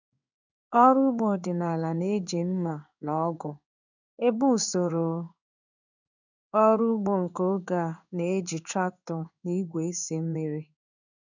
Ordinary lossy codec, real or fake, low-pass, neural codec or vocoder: none; fake; 7.2 kHz; codec, 16 kHz in and 24 kHz out, 1 kbps, XY-Tokenizer